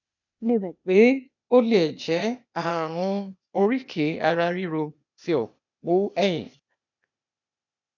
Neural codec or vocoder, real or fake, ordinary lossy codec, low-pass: codec, 16 kHz, 0.8 kbps, ZipCodec; fake; none; 7.2 kHz